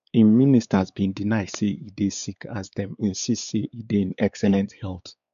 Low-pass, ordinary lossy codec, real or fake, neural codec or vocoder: 7.2 kHz; none; fake; codec, 16 kHz, 4 kbps, X-Codec, WavLM features, trained on Multilingual LibriSpeech